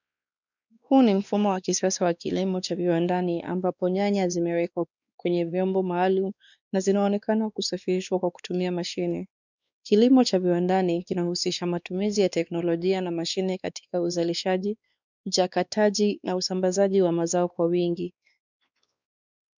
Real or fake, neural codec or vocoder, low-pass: fake; codec, 16 kHz, 2 kbps, X-Codec, WavLM features, trained on Multilingual LibriSpeech; 7.2 kHz